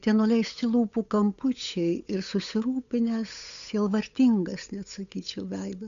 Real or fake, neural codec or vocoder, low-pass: fake; codec, 16 kHz, 8 kbps, FunCodec, trained on Chinese and English, 25 frames a second; 7.2 kHz